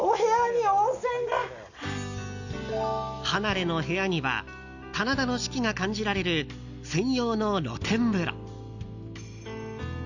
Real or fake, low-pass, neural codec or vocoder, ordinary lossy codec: real; 7.2 kHz; none; none